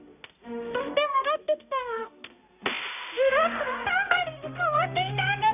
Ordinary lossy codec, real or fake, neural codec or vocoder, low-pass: none; fake; codec, 16 kHz, 0.5 kbps, X-Codec, HuBERT features, trained on balanced general audio; 3.6 kHz